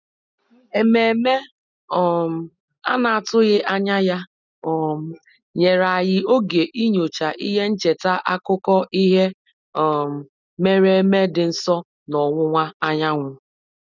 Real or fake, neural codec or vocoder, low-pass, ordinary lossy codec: real; none; 7.2 kHz; none